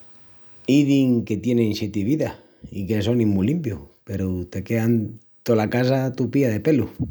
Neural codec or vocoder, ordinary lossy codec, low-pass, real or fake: none; none; none; real